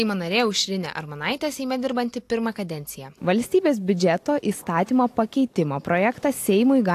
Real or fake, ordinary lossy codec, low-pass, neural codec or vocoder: real; AAC, 64 kbps; 14.4 kHz; none